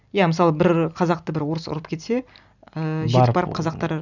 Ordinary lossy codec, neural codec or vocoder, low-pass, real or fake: none; none; 7.2 kHz; real